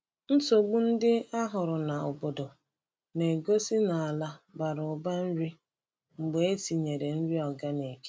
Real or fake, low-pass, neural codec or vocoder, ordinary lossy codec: real; none; none; none